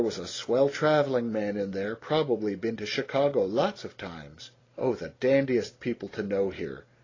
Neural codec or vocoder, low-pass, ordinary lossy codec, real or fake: none; 7.2 kHz; AAC, 32 kbps; real